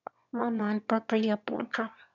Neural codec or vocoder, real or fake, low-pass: autoencoder, 22.05 kHz, a latent of 192 numbers a frame, VITS, trained on one speaker; fake; 7.2 kHz